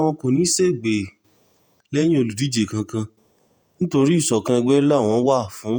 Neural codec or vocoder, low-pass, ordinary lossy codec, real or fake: vocoder, 48 kHz, 128 mel bands, Vocos; none; none; fake